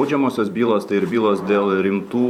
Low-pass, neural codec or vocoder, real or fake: 19.8 kHz; vocoder, 44.1 kHz, 128 mel bands every 512 samples, BigVGAN v2; fake